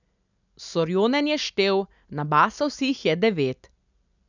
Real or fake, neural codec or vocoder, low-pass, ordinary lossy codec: real; none; 7.2 kHz; none